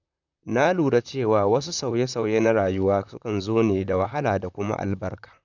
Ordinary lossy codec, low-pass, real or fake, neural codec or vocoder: none; 7.2 kHz; fake; vocoder, 44.1 kHz, 128 mel bands, Pupu-Vocoder